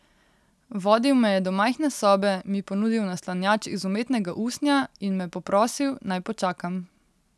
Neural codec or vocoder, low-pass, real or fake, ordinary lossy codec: none; none; real; none